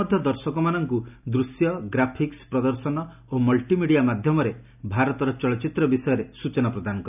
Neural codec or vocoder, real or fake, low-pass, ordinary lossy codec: none; real; 3.6 kHz; none